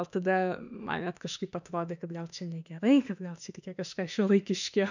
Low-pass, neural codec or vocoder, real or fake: 7.2 kHz; autoencoder, 48 kHz, 32 numbers a frame, DAC-VAE, trained on Japanese speech; fake